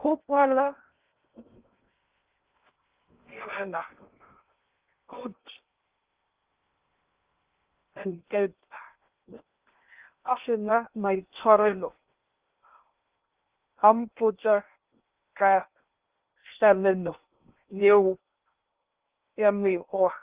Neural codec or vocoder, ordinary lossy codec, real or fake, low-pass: codec, 16 kHz in and 24 kHz out, 0.6 kbps, FocalCodec, streaming, 2048 codes; Opus, 32 kbps; fake; 3.6 kHz